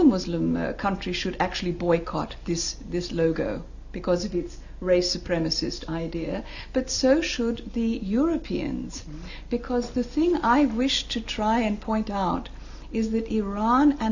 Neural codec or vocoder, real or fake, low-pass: none; real; 7.2 kHz